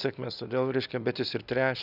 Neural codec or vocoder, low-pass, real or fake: none; 5.4 kHz; real